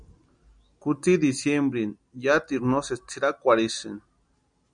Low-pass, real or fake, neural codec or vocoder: 9.9 kHz; real; none